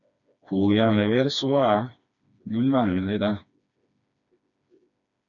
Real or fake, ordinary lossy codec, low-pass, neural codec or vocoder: fake; MP3, 96 kbps; 7.2 kHz; codec, 16 kHz, 2 kbps, FreqCodec, smaller model